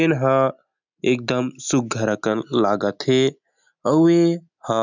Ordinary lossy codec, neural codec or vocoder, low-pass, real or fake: none; none; 7.2 kHz; real